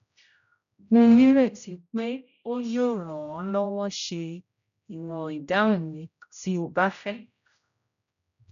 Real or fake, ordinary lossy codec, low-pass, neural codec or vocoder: fake; none; 7.2 kHz; codec, 16 kHz, 0.5 kbps, X-Codec, HuBERT features, trained on general audio